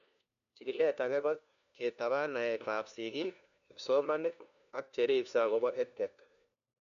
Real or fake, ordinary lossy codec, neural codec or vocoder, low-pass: fake; none; codec, 16 kHz, 1 kbps, FunCodec, trained on LibriTTS, 50 frames a second; 7.2 kHz